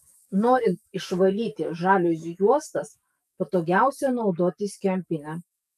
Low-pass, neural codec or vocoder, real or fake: 14.4 kHz; codec, 44.1 kHz, 7.8 kbps, DAC; fake